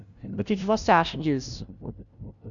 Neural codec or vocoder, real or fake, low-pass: codec, 16 kHz, 0.5 kbps, FunCodec, trained on LibriTTS, 25 frames a second; fake; 7.2 kHz